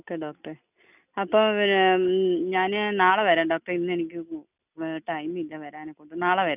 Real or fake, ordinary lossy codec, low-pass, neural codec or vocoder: real; none; 3.6 kHz; none